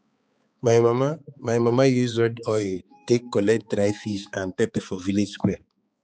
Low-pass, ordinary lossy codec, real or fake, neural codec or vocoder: none; none; fake; codec, 16 kHz, 4 kbps, X-Codec, HuBERT features, trained on balanced general audio